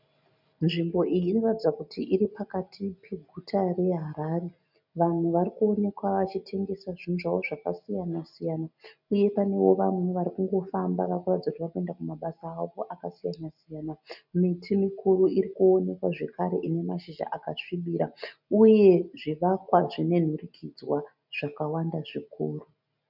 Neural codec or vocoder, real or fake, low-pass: none; real; 5.4 kHz